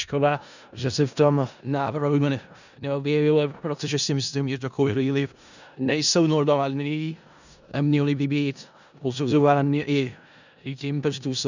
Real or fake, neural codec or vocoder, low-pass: fake; codec, 16 kHz in and 24 kHz out, 0.4 kbps, LongCat-Audio-Codec, four codebook decoder; 7.2 kHz